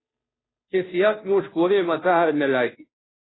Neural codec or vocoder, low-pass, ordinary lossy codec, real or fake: codec, 16 kHz, 0.5 kbps, FunCodec, trained on Chinese and English, 25 frames a second; 7.2 kHz; AAC, 16 kbps; fake